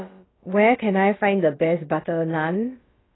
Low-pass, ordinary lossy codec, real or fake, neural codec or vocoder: 7.2 kHz; AAC, 16 kbps; fake; codec, 16 kHz, about 1 kbps, DyCAST, with the encoder's durations